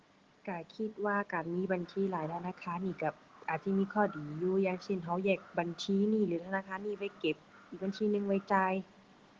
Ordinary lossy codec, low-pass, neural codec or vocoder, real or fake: Opus, 16 kbps; 7.2 kHz; none; real